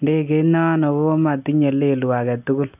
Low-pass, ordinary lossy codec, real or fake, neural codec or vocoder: 3.6 kHz; none; real; none